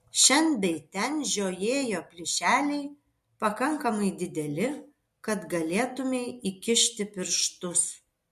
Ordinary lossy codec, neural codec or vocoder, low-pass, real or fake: MP3, 64 kbps; none; 14.4 kHz; real